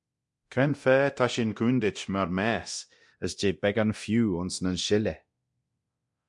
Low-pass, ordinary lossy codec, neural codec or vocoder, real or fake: 10.8 kHz; MP3, 64 kbps; codec, 24 kHz, 0.9 kbps, DualCodec; fake